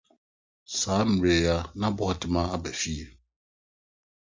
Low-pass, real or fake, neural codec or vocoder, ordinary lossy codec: 7.2 kHz; real; none; MP3, 64 kbps